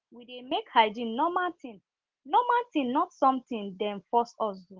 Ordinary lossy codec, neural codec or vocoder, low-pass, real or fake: Opus, 16 kbps; none; 7.2 kHz; real